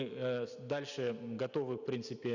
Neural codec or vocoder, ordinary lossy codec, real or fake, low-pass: none; none; real; 7.2 kHz